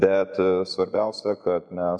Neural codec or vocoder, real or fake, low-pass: vocoder, 24 kHz, 100 mel bands, Vocos; fake; 9.9 kHz